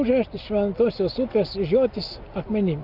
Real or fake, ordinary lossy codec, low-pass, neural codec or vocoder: real; Opus, 24 kbps; 5.4 kHz; none